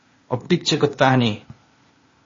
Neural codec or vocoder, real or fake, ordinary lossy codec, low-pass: codec, 16 kHz, 0.8 kbps, ZipCodec; fake; MP3, 32 kbps; 7.2 kHz